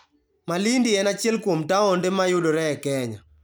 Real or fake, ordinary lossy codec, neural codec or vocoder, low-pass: real; none; none; none